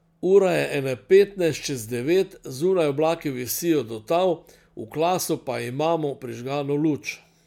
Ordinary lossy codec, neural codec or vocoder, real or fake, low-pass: MP3, 96 kbps; none; real; 19.8 kHz